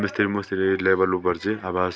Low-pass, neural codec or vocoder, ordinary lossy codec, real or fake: none; none; none; real